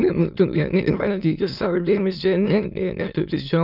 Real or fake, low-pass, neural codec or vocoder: fake; 5.4 kHz; autoencoder, 22.05 kHz, a latent of 192 numbers a frame, VITS, trained on many speakers